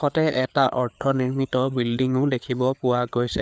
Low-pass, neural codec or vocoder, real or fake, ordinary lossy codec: none; codec, 16 kHz, 4 kbps, FreqCodec, larger model; fake; none